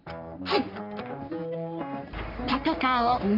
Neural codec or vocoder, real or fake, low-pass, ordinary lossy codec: codec, 44.1 kHz, 3.4 kbps, Pupu-Codec; fake; 5.4 kHz; none